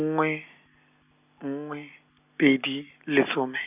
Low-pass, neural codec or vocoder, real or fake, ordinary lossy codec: 3.6 kHz; none; real; none